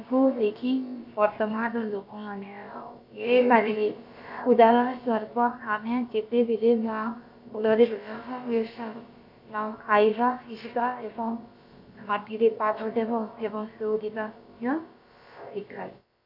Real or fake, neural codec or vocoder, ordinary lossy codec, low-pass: fake; codec, 16 kHz, about 1 kbps, DyCAST, with the encoder's durations; AAC, 48 kbps; 5.4 kHz